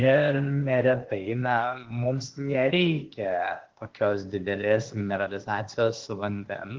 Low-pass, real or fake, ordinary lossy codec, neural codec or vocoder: 7.2 kHz; fake; Opus, 16 kbps; codec, 16 kHz, 0.8 kbps, ZipCodec